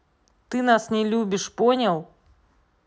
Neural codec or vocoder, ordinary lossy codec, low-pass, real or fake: none; none; none; real